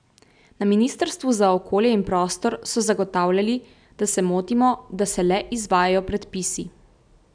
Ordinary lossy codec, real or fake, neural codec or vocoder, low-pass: none; real; none; 9.9 kHz